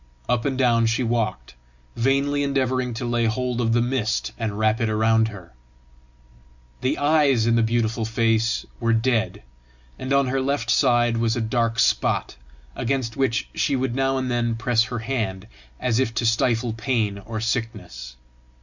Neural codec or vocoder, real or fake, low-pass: none; real; 7.2 kHz